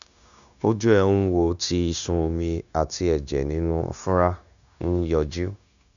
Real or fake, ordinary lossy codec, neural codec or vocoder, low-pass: fake; none; codec, 16 kHz, 0.9 kbps, LongCat-Audio-Codec; 7.2 kHz